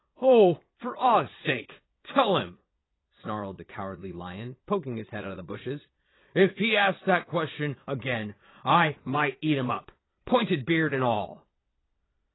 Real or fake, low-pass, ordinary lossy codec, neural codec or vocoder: fake; 7.2 kHz; AAC, 16 kbps; vocoder, 22.05 kHz, 80 mel bands, Vocos